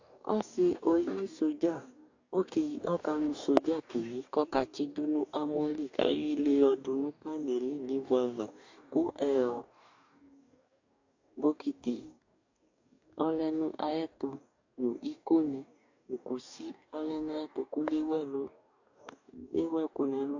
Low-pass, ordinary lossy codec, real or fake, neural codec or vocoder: 7.2 kHz; AAC, 48 kbps; fake; codec, 44.1 kHz, 2.6 kbps, DAC